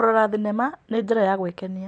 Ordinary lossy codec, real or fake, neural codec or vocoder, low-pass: none; real; none; 9.9 kHz